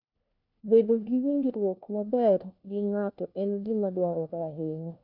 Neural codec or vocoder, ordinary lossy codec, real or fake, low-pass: codec, 16 kHz, 1 kbps, FunCodec, trained on LibriTTS, 50 frames a second; none; fake; 5.4 kHz